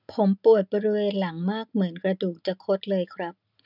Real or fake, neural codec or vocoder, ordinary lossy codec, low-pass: real; none; none; 5.4 kHz